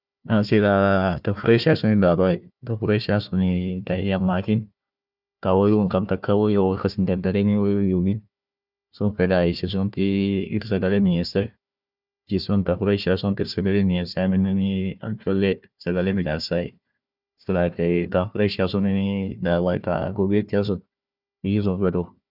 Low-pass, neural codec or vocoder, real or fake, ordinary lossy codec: 5.4 kHz; codec, 16 kHz, 1 kbps, FunCodec, trained on Chinese and English, 50 frames a second; fake; none